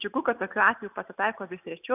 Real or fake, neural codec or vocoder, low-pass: real; none; 3.6 kHz